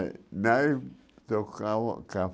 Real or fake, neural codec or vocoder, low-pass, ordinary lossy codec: real; none; none; none